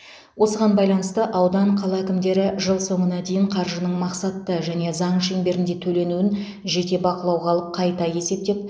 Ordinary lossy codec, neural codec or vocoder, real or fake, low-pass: none; none; real; none